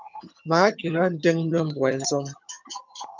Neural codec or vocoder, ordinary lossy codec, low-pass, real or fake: vocoder, 22.05 kHz, 80 mel bands, HiFi-GAN; MP3, 64 kbps; 7.2 kHz; fake